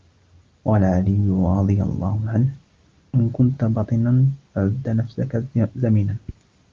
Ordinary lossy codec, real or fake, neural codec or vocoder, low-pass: Opus, 24 kbps; real; none; 7.2 kHz